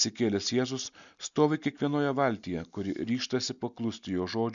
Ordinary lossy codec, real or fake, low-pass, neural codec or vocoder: MP3, 96 kbps; real; 7.2 kHz; none